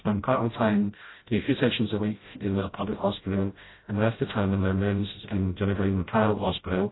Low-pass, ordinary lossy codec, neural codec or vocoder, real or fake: 7.2 kHz; AAC, 16 kbps; codec, 16 kHz, 0.5 kbps, FreqCodec, smaller model; fake